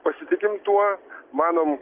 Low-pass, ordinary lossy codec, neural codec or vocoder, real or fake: 3.6 kHz; Opus, 32 kbps; none; real